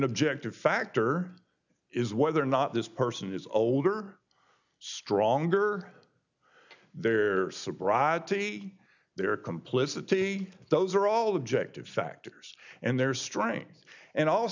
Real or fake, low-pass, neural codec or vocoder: real; 7.2 kHz; none